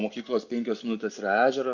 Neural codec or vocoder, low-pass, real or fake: none; 7.2 kHz; real